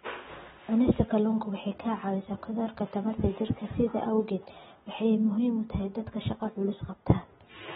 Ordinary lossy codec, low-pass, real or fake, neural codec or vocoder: AAC, 16 kbps; 10.8 kHz; real; none